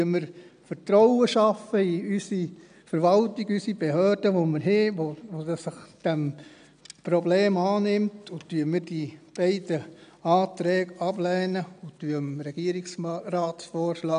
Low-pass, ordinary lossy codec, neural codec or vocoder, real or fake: 9.9 kHz; none; none; real